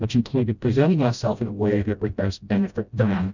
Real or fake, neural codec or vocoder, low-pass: fake; codec, 16 kHz, 0.5 kbps, FreqCodec, smaller model; 7.2 kHz